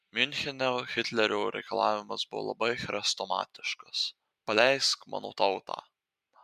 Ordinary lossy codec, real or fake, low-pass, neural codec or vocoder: MP3, 96 kbps; real; 14.4 kHz; none